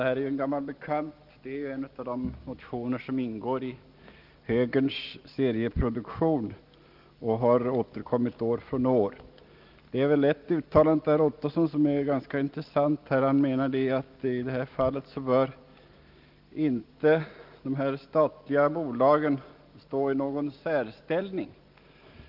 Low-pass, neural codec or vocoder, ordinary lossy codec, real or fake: 5.4 kHz; none; Opus, 32 kbps; real